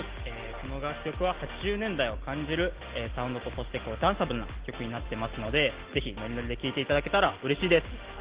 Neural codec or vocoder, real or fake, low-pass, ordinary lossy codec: none; real; 3.6 kHz; Opus, 16 kbps